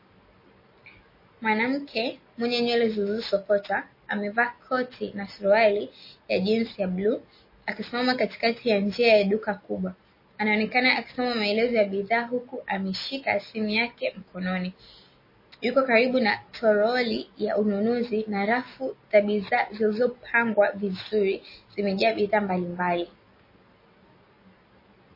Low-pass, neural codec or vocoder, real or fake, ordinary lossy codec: 5.4 kHz; none; real; MP3, 24 kbps